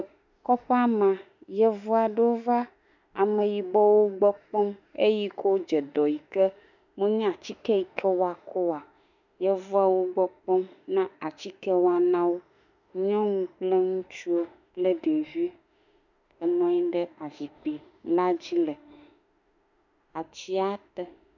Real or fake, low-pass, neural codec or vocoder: fake; 7.2 kHz; autoencoder, 48 kHz, 32 numbers a frame, DAC-VAE, trained on Japanese speech